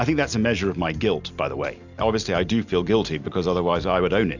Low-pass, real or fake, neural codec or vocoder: 7.2 kHz; real; none